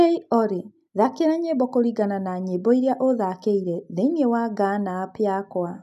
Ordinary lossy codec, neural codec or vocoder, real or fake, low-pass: none; none; real; 14.4 kHz